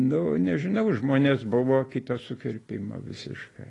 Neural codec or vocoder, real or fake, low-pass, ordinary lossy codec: none; real; 10.8 kHz; AAC, 32 kbps